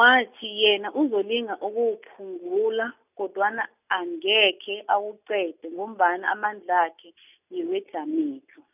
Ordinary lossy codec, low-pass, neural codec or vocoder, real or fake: none; 3.6 kHz; none; real